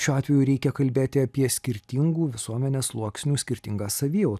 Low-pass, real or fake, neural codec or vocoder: 14.4 kHz; real; none